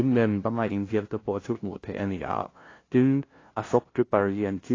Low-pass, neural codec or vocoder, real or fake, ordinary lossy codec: 7.2 kHz; codec, 16 kHz, 0.5 kbps, FunCodec, trained on LibriTTS, 25 frames a second; fake; AAC, 32 kbps